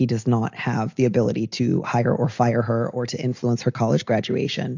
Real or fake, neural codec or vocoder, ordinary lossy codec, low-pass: real; none; AAC, 48 kbps; 7.2 kHz